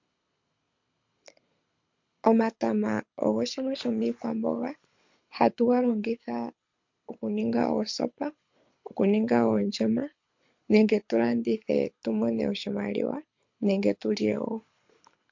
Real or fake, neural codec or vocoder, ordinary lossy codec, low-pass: fake; codec, 24 kHz, 6 kbps, HILCodec; MP3, 48 kbps; 7.2 kHz